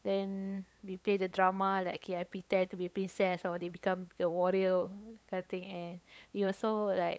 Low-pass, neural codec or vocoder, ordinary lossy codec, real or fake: none; codec, 16 kHz, 8 kbps, FunCodec, trained on LibriTTS, 25 frames a second; none; fake